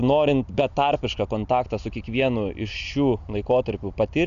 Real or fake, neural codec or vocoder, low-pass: real; none; 7.2 kHz